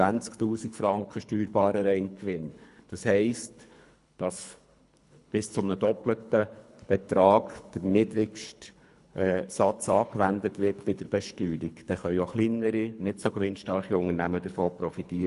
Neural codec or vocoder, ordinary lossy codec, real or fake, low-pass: codec, 24 kHz, 3 kbps, HILCodec; none; fake; 10.8 kHz